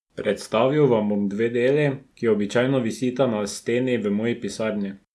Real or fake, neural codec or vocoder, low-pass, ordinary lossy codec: real; none; none; none